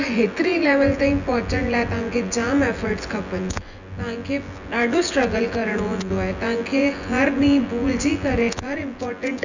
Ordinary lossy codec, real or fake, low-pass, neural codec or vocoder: none; fake; 7.2 kHz; vocoder, 24 kHz, 100 mel bands, Vocos